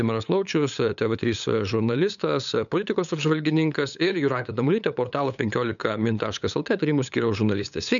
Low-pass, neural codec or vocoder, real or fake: 7.2 kHz; codec, 16 kHz, 8 kbps, FunCodec, trained on LibriTTS, 25 frames a second; fake